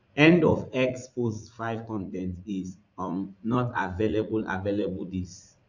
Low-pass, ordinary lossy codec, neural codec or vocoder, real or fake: 7.2 kHz; none; vocoder, 44.1 kHz, 80 mel bands, Vocos; fake